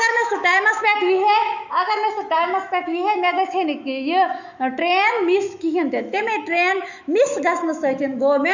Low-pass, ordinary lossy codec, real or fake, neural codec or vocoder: 7.2 kHz; none; fake; autoencoder, 48 kHz, 128 numbers a frame, DAC-VAE, trained on Japanese speech